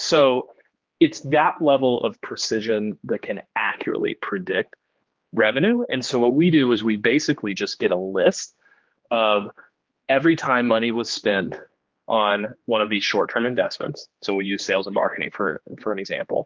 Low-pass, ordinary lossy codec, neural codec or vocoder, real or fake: 7.2 kHz; Opus, 32 kbps; codec, 16 kHz, 2 kbps, X-Codec, HuBERT features, trained on general audio; fake